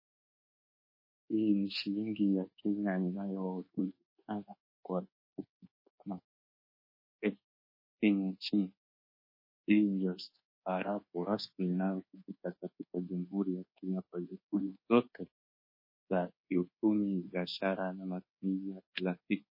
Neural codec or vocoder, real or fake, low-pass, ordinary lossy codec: codec, 24 kHz, 1.2 kbps, DualCodec; fake; 5.4 kHz; MP3, 24 kbps